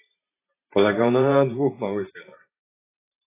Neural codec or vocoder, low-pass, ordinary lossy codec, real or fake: vocoder, 44.1 kHz, 128 mel bands every 512 samples, BigVGAN v2; 3.6 kHz; AAC, 16 kbps; fake